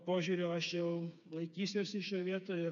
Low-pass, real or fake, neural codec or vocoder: 7.2 kHz; fake; codec, 16 kHz, 4 kbps, FreqCodec, smaller model